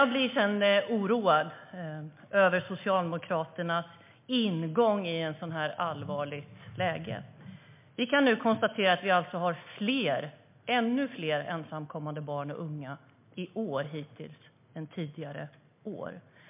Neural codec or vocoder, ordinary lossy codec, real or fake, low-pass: none; MP3, 32 kbps; real; 3.6 kHz